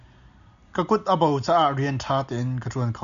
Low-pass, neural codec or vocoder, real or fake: 7.2 kHz; none; real